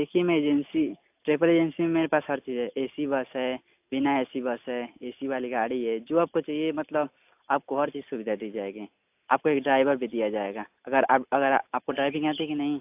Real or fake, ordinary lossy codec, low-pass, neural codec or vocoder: real; AAC, 32 kbps; 3.6 kHz; none